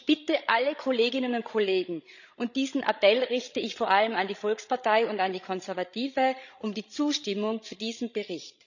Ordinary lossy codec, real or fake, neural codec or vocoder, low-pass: none; fake; codec, 16 kHz, 16 kbps, FreqCodec, larger model; 7.2 kHz